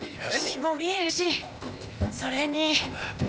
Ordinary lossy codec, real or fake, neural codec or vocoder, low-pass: none; fake; codec, 16 kHz, 0.8 kbps, ZipCodec; none